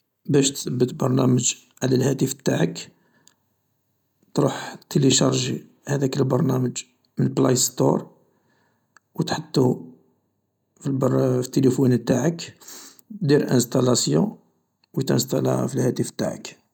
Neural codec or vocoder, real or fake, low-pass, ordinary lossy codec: none; real; 19.8 kHz; none